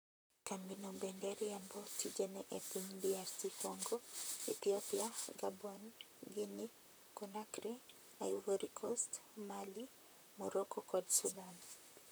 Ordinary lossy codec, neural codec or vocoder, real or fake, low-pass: none; vocoder, 44.1 kHz, 128 mel bands, Pupu-Vocoder; fake; none